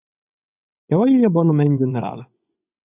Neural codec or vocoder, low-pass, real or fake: vocoder, 24 kHz, 100 mel bands, Vocos; 3.6 kHz; fake